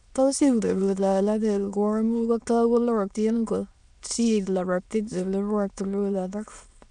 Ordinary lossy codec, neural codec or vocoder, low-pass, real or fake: none; autoencoder, 22.05 kHz, a latent of 192 numbers a frame, VITS, trained on many speakers; 9.9 kHz; fake